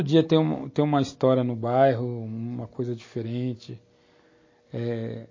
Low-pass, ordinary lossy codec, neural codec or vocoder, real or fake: 7.2 kHz; MP3, 32 kbps; none; real